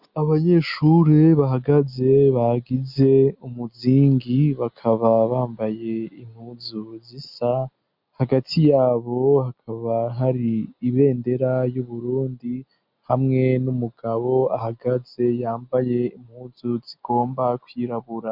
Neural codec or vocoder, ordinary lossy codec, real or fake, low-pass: none; MP3, 48 kbps; real; 5.4 kHz